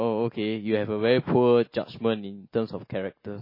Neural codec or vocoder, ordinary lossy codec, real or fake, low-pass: none; MP3, 24 kbps; real; 5.4 kHz